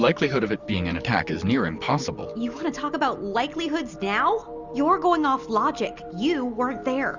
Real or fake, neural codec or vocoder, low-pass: real; none; 7.2 kHz